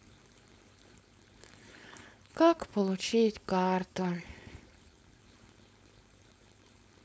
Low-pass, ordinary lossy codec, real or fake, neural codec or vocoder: none; none; fake; codec, 16 kHz, 4.8 kbps, FACodec